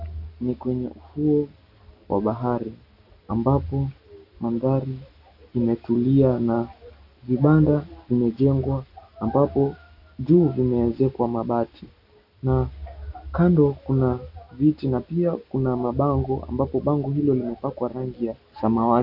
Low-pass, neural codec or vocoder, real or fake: 5.4 kHz; none; real